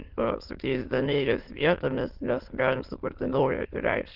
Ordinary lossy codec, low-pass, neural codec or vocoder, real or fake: Opus, 16 kbps; 5.4 kHz; autoencoder, 22.05 kHz, a latent of 192 numbers a frame, VITS, trained on many speakers; fake